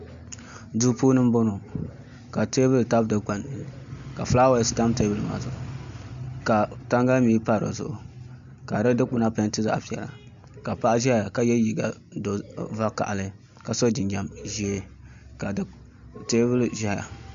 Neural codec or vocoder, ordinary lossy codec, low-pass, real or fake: none; MP3, 64 kbps; 7.2 kHz; real